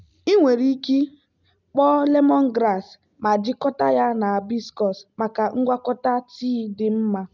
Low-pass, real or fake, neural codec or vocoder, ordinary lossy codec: 7.2 kHz; real; none; none